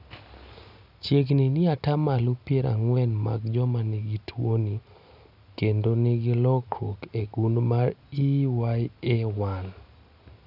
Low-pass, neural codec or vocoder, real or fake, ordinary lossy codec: 5.4 kHz; none; real; none